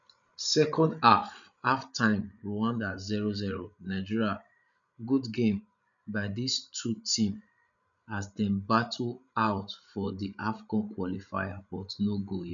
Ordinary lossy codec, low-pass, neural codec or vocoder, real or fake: none; 7.2 kHz; codec, 16 kHz, 16 kbps, FreqCodec, larger model; fake